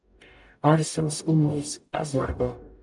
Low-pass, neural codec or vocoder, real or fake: 10.8 kHz; codec, 44.1 kHz, 0.9 kbps, DAC; fake